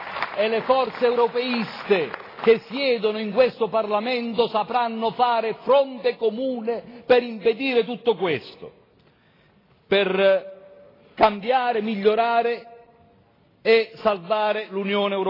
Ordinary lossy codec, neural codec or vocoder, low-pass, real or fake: AAC, 24 kbps; none; 5.4 kHz; real